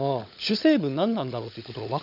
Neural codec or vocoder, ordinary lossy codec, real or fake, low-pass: codec, 16 kHz, 16 kbps, FunCodec, trained on LibriTTS, 50 frames a second; none; fake; 5.4 kHz